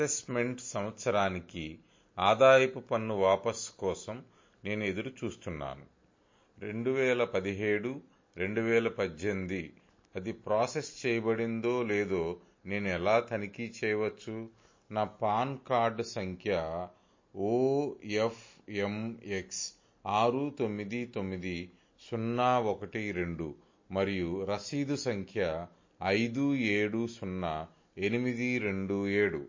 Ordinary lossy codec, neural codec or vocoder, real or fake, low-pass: MP3, 32 kbps; none; real; 7.2 kHz